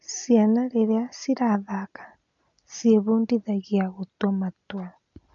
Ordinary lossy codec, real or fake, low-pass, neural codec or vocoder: none; real; 7.2 kHz; none